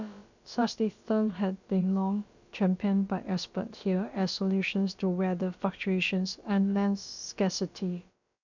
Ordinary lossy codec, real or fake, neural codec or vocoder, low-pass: none; fake; codec, 16 kHz, about 1 kbps, DyCAST, with the encoder's durations; 7.2 kHz